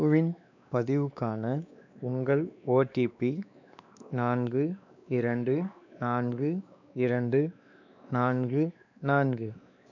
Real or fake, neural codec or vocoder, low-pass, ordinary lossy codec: fake; codec, 16 kHz, 2 kbps, X-Codec, WavLM features, trained on Multilingual LibriSpeech; 7.2 kHz; none